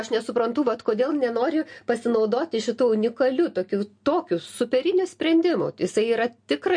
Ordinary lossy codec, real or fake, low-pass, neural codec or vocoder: MP3, 48 kbps; real; 9.9 kHz; none